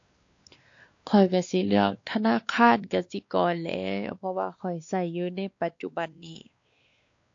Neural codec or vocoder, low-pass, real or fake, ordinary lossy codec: codec, 16 kHz, 2 kbps, X-Codec, WavLM features, trained on Multilingual LibriSpeech; 7.2 kHz; fake; AAC, 48 kbps